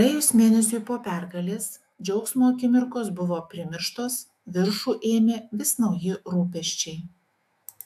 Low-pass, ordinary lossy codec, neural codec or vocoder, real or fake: 14.4 kHz; AAC, 96 kbps; autoencoder, 48 kHz, 128 numbers a frame, DAC-VAE, trained on Japanese speech; fake